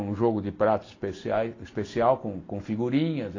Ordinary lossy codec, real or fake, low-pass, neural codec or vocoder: AAC, 32 kbps; real; 7.2 kHz; none